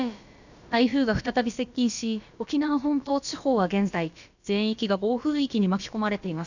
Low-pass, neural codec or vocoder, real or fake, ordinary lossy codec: 7.2 kHz; codec, 16 kHz, about 1 kbps, DyCAST, with the encoder's durations; fake; none